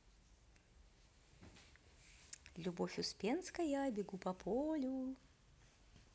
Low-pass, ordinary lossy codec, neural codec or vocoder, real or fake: none; none; none; real